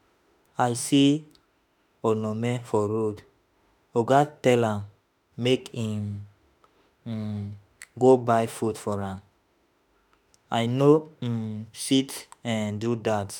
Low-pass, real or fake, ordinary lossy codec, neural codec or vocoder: none; fake; none; autoencoder, 48 kHz, 32 numbers a frame, DAC-VAE, trained on Japanese speech